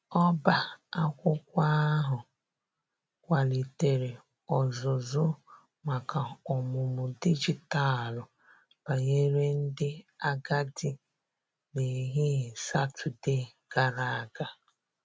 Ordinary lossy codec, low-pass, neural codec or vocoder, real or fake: none; none; none; real